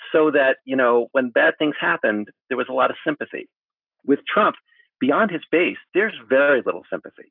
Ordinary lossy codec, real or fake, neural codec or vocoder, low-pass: AAC, 48 kbps; real; none; 5.4 kHz